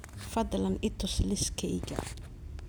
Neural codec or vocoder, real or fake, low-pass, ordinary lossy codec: none; real; none; none